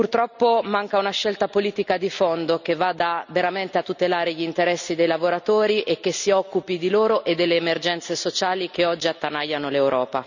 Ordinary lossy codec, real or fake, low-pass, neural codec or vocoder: none; real; 7.2 kHz; none